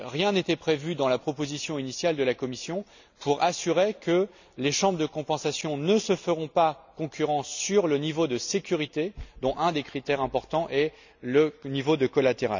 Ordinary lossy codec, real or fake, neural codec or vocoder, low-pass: none; real; none; 7.2 kHz